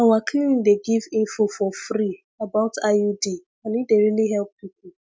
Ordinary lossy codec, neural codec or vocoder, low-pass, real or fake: none; none; none; real